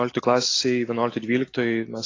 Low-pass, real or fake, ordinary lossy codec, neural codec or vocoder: 7.2 kHz; real; AAC, 32 kbps; none